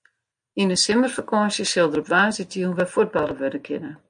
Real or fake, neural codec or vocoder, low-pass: real; none; 9.9 kHz